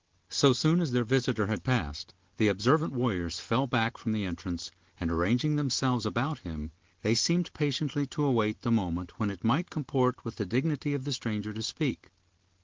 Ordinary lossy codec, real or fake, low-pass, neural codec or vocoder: Opus, 16 kbps; real; 7.2 kHz; none